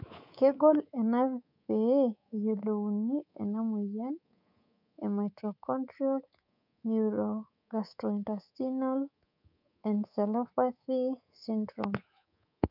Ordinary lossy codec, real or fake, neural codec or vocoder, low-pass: none; fake; codec, 24 kHz, 3.1 kbps, DualCodec; 5.4 kHz